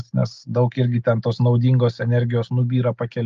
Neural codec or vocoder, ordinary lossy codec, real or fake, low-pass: none; Opus, 32 kbps; real; 7.2 kHz